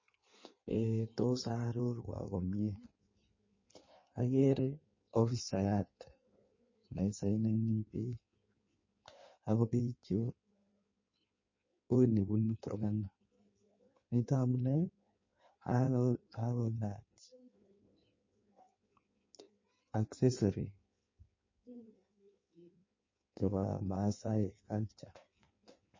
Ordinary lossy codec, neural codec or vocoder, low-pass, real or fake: MP3, 32 kbps; codec, 16 kHz in and 24 kHz out, 1.1 kbps, FireRedTTS-2 codec; 7.2 kHz; fake